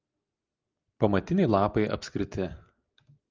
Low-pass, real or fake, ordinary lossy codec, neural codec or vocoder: 7.2 kHz; real; Opus, 24 kbps; none